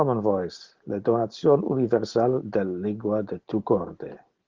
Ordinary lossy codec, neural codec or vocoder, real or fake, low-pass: Opus, 16 kbps; none; real; 7.2 kHz